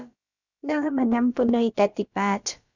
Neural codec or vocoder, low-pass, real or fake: codec, 16 kHz, about 1 kbps, DyCAST, with the encoder's durations; 7.2 kHz; fake